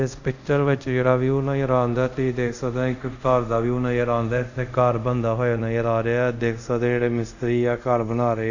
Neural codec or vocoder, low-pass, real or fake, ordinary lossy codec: codec, 24 kHz, 0.5 kbps, DualCodec; 7.2 kHz; fake; none